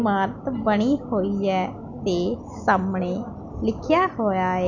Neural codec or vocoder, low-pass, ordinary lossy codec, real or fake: none; 7.2 kHz; none; real